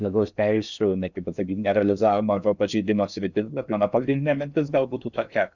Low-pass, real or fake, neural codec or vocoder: 7.2 kHz; fake; codec, 16 kHz in and 24 kHz out, 0.6 kbps, FocalCodec, streaming, 4096 codes